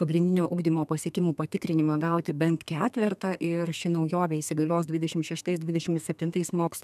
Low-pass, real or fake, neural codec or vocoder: 14.4 kHz; fake; codec, 32 kHz, 1.9 kbps, SNAC